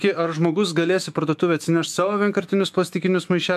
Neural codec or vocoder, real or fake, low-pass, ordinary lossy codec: autoencoder, 48 kHz, 128 numbers a frame, DAC-VAE, trained on Japanese speech; fake; 14.4 kHz; MP3, 96 kbps